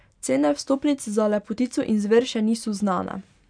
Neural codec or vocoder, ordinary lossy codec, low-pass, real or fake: none; none; 9.9 kHz; real